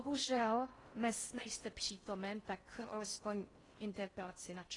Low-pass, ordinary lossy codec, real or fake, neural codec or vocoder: 10.8 kHz; AAC, 32 kbps; fake; codec, 16 kHz in and 24 kHz out, 0.6 kbps, FocalCodec, streaming, 4096 codes